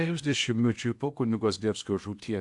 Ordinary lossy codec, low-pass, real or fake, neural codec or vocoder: AAC, 64 kbps; 10.8 kHz; fake; codec, 16 kHz in and 24 kHz out, 0.8 kbps, FocalCodec, streaming, 65536 codes